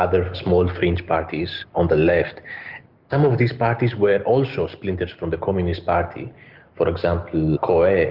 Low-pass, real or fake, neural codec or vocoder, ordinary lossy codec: 5.4 kHz; real; none; Opus, 32 kbps